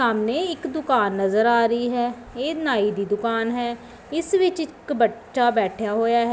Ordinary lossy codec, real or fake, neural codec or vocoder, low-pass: none; real; none; none